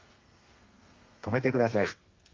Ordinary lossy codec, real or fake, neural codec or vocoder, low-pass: Opus, 32 kbps; fake; codec, 32 kHz, 1.9 kbps, SNAC; 7.2 kHz